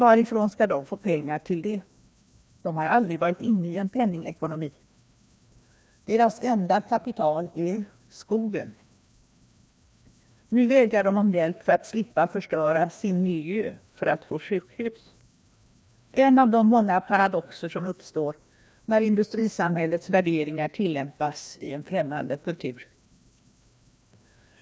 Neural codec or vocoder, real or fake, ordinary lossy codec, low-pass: codec, 16 kHz, 1 kbps, FreqCodec, larger model; fake; none; none